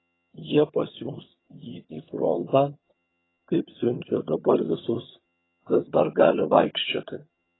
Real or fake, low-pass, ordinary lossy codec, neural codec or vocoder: fake; 7.2 kHz; AAC, 16 kbps; vocoder, 22.05 kHz, 80 mel bands, HiFi-GAN